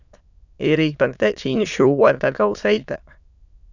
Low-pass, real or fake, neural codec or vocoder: 7.2 kHz; fake; autoencoder, 22.05 kHz, a latent of 192 numbers a frame, VITS, trained on many speakers